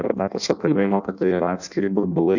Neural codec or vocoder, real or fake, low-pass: codec, 16 kHz in and 24 kHz out, 0.6 kbps, FireRedTTS-2 codec; fake; 7.2 kHz